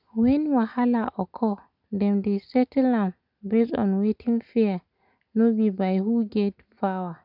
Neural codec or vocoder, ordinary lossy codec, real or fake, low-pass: none; none; real; 5.4 kHz